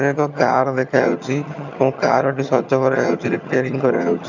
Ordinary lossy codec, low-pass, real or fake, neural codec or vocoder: none; 7.2 kHz; fake; vocoder, 22.05 kHz, 80 mel bands, HiFi-GAN